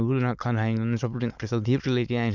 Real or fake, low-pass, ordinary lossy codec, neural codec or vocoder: fake; 7.2 kHz; none; autoencoder, 22.05 kHz, a latent of 192 numbers a frame, VITS, trained on many speakers